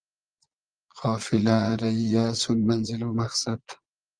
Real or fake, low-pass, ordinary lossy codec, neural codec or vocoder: fake; 9.9 kHz; Opus, 24 kbps; vocoder, 22.05 kHz, 80 mel bands, Vocos